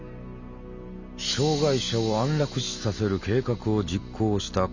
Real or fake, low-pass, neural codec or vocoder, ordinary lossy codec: real; 7.2 kHz; none; none